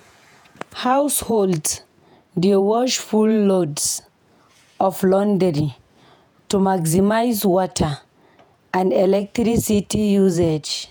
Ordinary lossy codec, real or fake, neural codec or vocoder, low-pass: none; fake; vocoder, 48 kHz, 128 mel bands, Vocos; none